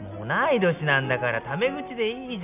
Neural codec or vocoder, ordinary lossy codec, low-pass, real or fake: none; none; 3.6 kHz; real